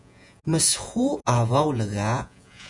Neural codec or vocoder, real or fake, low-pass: vocoder, 48 kHz, 128 mel bands, Vocos; fake; 10.8 kHz